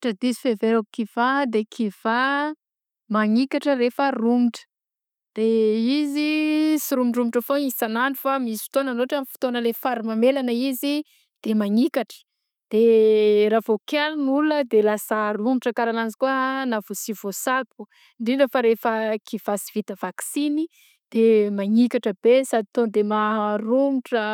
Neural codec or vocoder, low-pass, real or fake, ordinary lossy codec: none; 19.8 kHz; real; none